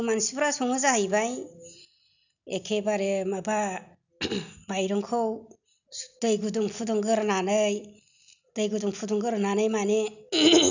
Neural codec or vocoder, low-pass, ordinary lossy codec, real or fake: none; 7.2 kHz; none; real